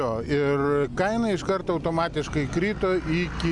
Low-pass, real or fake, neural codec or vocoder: 10.8 kHz; real; none